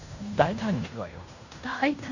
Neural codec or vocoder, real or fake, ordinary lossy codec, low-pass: codec, 16 kHz in and 24 kHz out, 0.9 kbps, LongCat-Audio-Codec, fine tuned four codebook decoder; fake; none; 7.2 kHz